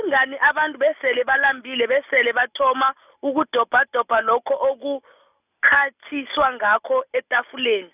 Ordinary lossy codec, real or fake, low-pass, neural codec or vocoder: none; real; 3.6 kHz; none